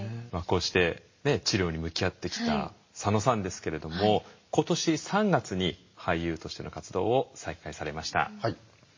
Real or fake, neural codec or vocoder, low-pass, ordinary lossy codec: real; none; 7.2 kHz; MP3, 32 kbps